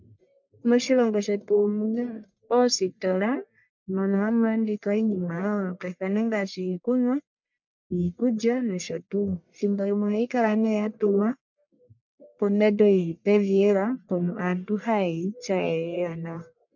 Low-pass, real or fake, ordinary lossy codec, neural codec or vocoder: 7.2 kHz; fake; MP3, 64 kbps; codec, 44.1 kHz, 1.7 kbps, Pupu-Codec